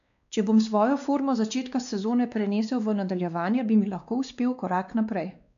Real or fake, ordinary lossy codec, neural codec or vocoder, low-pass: fake; none; codec, 16 kHz, 2 kbps, X-Codec, WavLM features, trained on Multilingual LibriSpeech; 7.2 kHz